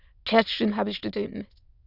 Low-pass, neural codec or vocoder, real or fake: 5.4 kHz; autoencoder, 22.05 kHz, a latent of 192 numbers a frame, VITS, trained on many speakers; fake